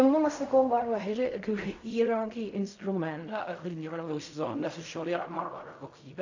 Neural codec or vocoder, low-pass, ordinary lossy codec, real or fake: codec, 16 kHz in and 24 kHz out, 0.4 kbps, LongCat-Audio-Codec, fine tuned four codebook decoder; 7.2 kHz; AAC, 48 kbps; fake